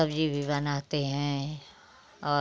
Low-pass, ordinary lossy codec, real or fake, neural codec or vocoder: none; none; real; none